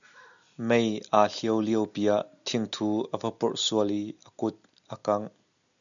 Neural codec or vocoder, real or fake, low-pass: none; real; 7.2 kHz